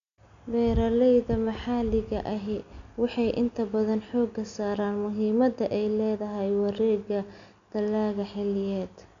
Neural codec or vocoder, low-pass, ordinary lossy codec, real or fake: none; 7.2 kHz; MP3, 96 kbps; real